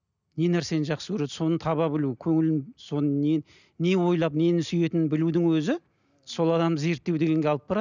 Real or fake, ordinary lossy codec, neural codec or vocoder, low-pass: real; none; none; 7.2 kHz